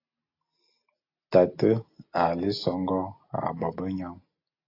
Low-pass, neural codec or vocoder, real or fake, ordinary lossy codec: 5.4 kHz; none; real; AAC, 32 kbps